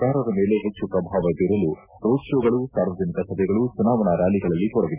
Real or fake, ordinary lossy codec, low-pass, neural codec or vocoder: real; none; 3.6 kHz; none